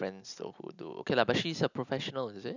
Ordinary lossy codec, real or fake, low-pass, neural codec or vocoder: none; real; 7.2 kHz; none